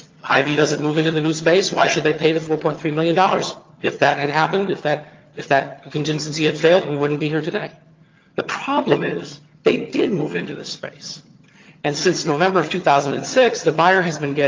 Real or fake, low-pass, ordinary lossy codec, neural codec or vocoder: fake; 7.2 kHz; Opus, 32 kbps; vocoder, 22.05 kHz, 80 mel bands, HiFi-GAN